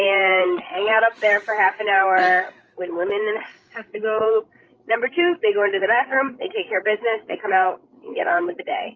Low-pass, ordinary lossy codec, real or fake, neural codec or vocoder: 7.2 kHz; Opus, 24 kbps; fake; codec, 16 kHz, 16 kbps, FreqCodec, larger model